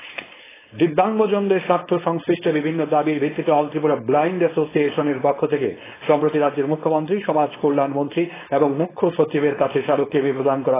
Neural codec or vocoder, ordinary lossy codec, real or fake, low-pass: codec, 16 kHz, 4.8 kbps, FACodec; AAC, 16 kbps; fake; 3.6 kHz